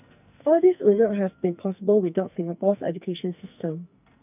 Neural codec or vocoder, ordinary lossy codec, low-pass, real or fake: codec, 44.1 kHz, 2.6 kbps, SNAC; none; 3.6 kHz; fake